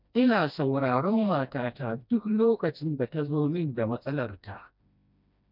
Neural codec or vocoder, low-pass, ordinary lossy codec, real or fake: codec, 16 kHz, 1 kbps, FreqCodec, smaller model; 5.4 kHz; none; fake